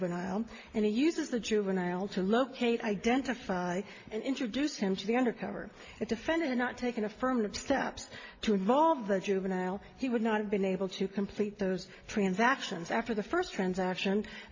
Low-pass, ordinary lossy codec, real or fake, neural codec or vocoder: 7.2 kHz; MP3, 32 kbps; real; none